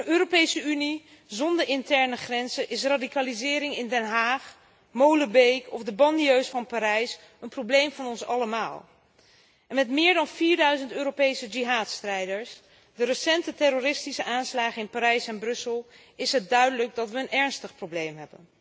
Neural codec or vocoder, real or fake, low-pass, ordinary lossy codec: none; real; none; none